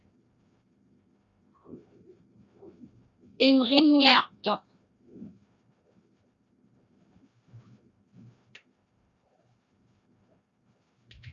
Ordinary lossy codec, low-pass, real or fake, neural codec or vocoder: Opus, 64 kbps; 7.2 kHz; fake; codec, 16 kHz, 1 kbps, FreqCodec, larger model